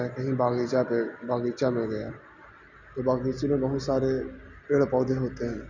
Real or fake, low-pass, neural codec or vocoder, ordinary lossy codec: real; 7.2 kHz; none; none